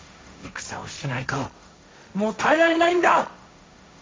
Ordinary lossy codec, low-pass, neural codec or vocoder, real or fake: none; none; codec, 16 kHz, 1.1 kbps, Voila-Tokenizer; fake